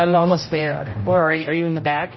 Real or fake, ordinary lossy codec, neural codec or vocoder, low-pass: fake; MP3, 24 kbps; codec, 16 kHz, 0.5 kbps, X-Codec, HuBERT features, trained on general audio; 7.2 kHz